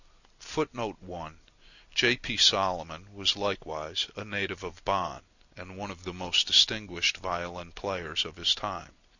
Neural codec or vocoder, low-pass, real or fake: none; 7.2 kHz; real